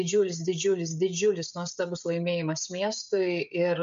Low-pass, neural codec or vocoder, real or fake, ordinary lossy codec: 7.2 kHz; codec, 16 kHz, 16 kbps, FreqCodec, smaller model; fake; MP3, 48 kbps